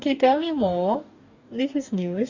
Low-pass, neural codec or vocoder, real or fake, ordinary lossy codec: 7.2 kHz; codec, 44.1 kHz, 2.6 kbps, DAC; fake; Opus, 64 kbps